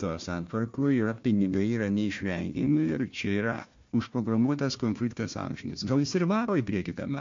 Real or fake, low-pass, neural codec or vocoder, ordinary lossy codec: fake; 7.2 kHz; codec, 16 kHz, 1 kbps, FunCodec, trained on Chinese and English, 50 frames a second; MP3, 48 kbps